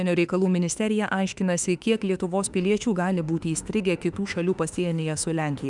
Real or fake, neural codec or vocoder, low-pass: fake; autoencoder, 48 kHz, 32 numbers a frame, DAC-VAE, trained on Japanese speech; 10.8 kHz